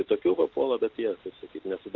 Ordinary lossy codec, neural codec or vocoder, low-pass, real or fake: Opus, 24 kbps; none; 7.2 kHz; real